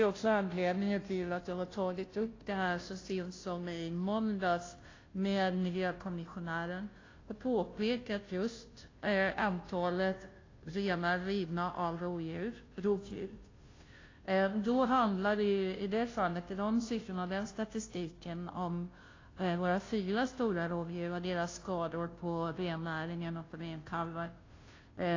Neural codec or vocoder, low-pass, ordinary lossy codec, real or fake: codec, 16 kHz, 0.5 kbps, FunCodec, trained on Chinese and English, 25 frames a second; 7.2 kHz; AAC, 32 kbps; fake